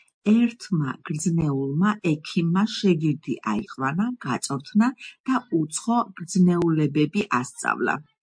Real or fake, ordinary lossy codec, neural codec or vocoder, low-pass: real; MP3, 48 kbps; none; 9.9 kHz